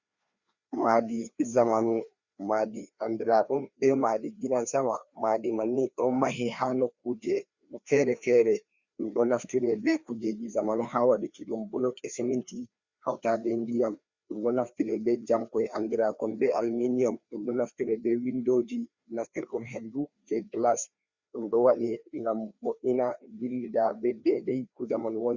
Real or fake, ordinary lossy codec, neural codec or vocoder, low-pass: fake; Opus, 64 kbps; codec, 16 kHz, 2 kbps, FreqCodec, larger model; 7.2 kHz